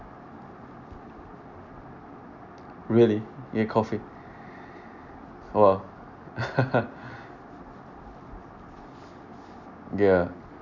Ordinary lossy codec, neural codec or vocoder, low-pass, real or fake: none; none; 7.2 kHz; real